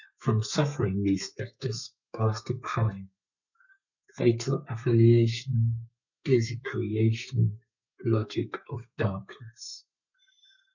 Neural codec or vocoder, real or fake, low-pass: codec, 32 kHz, 1.9 kbps, SNAC; fake; 7.2 kHz